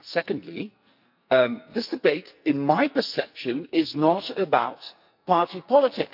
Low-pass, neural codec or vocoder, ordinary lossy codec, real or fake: 5.4 kHz; codec, 44.1 kHz, 2.6 kbps, SNAC; none; fake